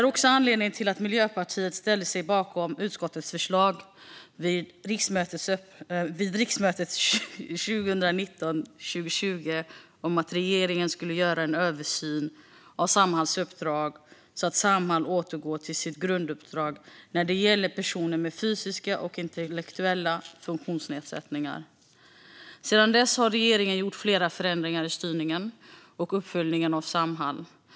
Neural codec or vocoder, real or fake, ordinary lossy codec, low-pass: none; real; none; none